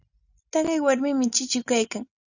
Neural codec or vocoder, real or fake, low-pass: none; real; 7.2 kHz